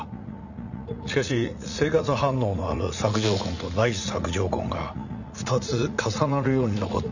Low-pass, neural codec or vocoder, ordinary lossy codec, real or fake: 7.2 kHz; vocoder, 44.1 kHz, 80 mel bands, Vocos; none; fake